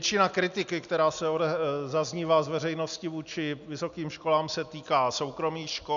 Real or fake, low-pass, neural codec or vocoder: real; 7.2 kHz; none